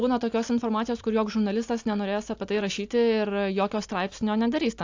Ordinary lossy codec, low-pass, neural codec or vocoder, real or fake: AAC, 48 kbps; 7.2 kHz; none; real